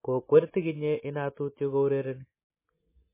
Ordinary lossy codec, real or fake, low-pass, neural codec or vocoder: MP3, 16 kbps; real; 3.6 kHz; none